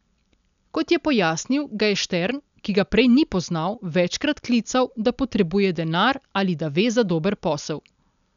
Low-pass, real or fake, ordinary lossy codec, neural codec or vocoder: 7.2 kHz; real; none; none